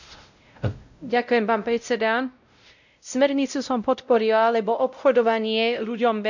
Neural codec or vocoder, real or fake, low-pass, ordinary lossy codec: codec, 16 kHz, 0.5 kbps, X-Codec, WavLM features, trained on Multilingual LibriSpeech; fake; 7.2 kHz; none